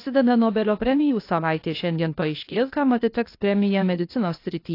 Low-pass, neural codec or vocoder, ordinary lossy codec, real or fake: 5.4 kHz; codec, 16 kHz, 0.8 kbps, ZipCodec; AAC, 32 kbps; fake